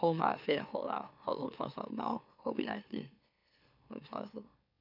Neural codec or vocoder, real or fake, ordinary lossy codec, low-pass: autoencoder, 44.1 kHz, a latent of 192 numbers a frame, MeloTTS; fake; none; 5.4 kHz